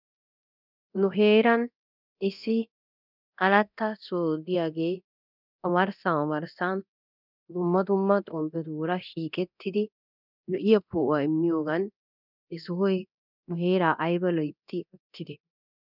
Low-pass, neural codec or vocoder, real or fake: 5.4 kHz; codec, 24 kHz, 0.9 kbps, DualCodec; fake